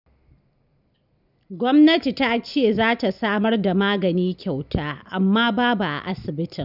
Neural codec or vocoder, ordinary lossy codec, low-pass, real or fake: none; none; 5.4 kHz; real